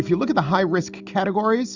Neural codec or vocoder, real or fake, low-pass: none; real; 7.2 kHz